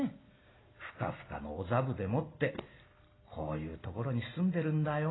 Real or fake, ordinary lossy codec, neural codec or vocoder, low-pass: real; AAC, 16 kbps; none; 7.2 kHz